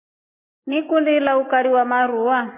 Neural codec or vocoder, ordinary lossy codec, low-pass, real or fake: none; MP3, 24 kbps; 3.6 kHz; real